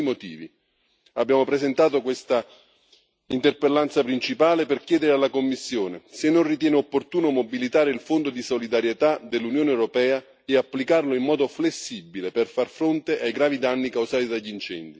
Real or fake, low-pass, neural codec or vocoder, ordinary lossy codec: real; none; none; none